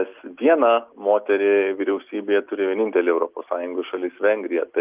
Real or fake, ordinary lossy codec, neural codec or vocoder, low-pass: real; Opus, 32 kbps; none; 3.6 kHz